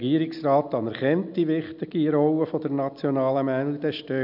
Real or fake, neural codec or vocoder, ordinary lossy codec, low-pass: real; none; none; 5.4 kHz